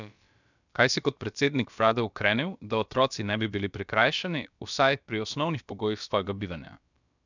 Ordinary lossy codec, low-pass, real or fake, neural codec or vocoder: none; 7.2 kHz; fake; codec, 16 kHz, about 1 kbps, DyCAST, with the encoder's durations